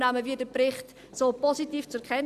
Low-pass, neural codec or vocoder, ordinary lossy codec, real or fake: 14.4 kHz; none; Opus, 64 kbps; real